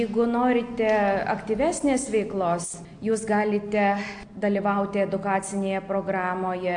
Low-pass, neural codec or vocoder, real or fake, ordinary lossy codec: 9.9 kHz; none; real; AAC, 64 kbps